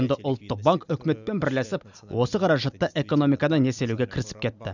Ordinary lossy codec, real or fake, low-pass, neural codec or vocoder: none; real; 7.2 kHz; none